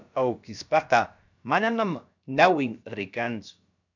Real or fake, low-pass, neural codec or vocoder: fake; 7.2 kHz; codec, 16 kHz, about 1 kbps, DyCAST, with the encoder's durations